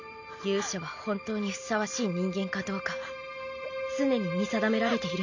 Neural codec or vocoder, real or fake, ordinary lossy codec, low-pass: none; real; none; 7.2 kHz